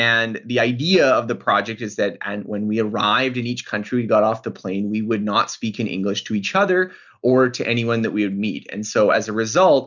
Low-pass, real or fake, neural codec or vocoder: 7.2 kHz; real; none